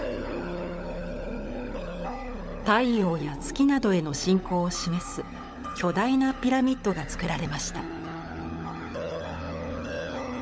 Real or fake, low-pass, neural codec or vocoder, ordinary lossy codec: fake; none; codec, 16 kHz, 16 kbps, FunCodec, trained on LibriTTS, 50 frames a second; none